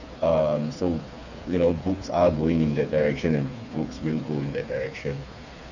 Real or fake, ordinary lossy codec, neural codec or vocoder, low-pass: fake; none; codec, 16 kHz, 4 kbps, FreqCodec, smaller model; 7.2 kHz